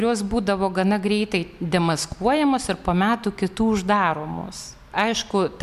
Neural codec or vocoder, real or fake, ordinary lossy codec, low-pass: none; real; MP3, 96 kbps; 14.4 kHz